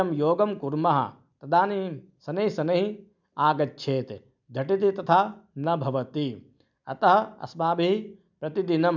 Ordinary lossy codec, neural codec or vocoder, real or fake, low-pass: none; none; real; 7.2 kHz